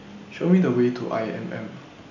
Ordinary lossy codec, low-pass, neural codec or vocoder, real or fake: none; 7.2 kHz; none; real